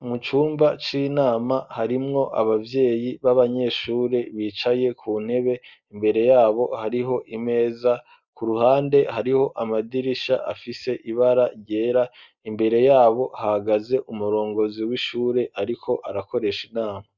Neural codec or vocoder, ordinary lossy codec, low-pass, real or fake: none; Opus, 64 kbps; 7.2 kHz; real